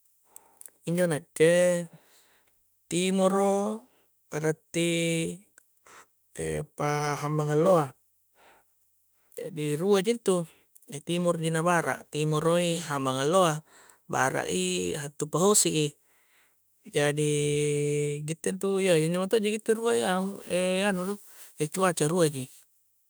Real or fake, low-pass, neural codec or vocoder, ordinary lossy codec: fake; none; autoencoder, 48 kHz, 32 numbers a frame, DAC-VAE, trained on Japanese speech; none